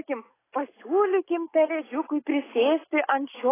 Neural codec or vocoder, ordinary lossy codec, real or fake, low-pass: none; AAC, 16 kbps; real; 3.6 kHz